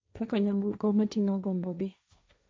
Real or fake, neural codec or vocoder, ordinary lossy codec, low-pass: fake; codec, 16 kHz, 1.1 kbps, Voila-Tokenizer; none; none